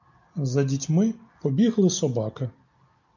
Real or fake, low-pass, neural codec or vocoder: real; 7.2 kHz; none